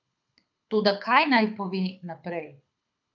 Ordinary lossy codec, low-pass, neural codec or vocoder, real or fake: none; 7.2 kHz; codec, 24 kHz, 6 kbps, HILCodec; fake